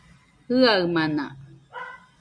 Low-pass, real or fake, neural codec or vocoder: 9.9 kHz; real; none